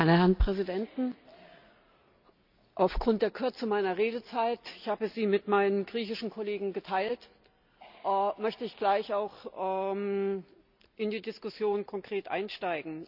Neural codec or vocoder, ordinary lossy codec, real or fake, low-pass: none; none; real; 5.4 kHz